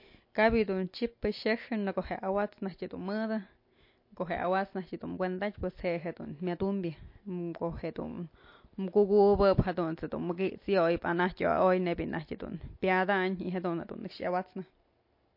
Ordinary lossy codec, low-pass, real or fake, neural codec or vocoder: MP3, 32 kbps; 5.4 kHz; real; none